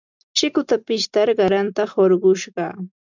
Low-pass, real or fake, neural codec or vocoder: 7.2 kHz; real; none